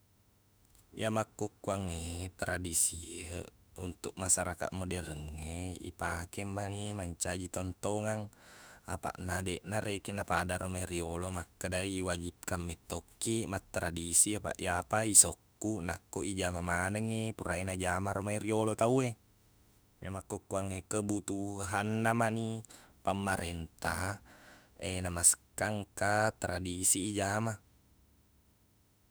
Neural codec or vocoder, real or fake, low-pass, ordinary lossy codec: autoencoder, 48 kHz, 32 numbers a frame, DAC-VAE, trained on Japanese speech; fake; none; none